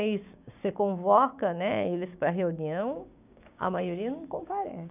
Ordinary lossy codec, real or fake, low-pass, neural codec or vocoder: none; fake; 3.6 kHz; codec, 16 kHz, 6 kbps, DAC